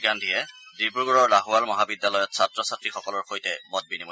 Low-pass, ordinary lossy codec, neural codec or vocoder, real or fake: none; none; none; real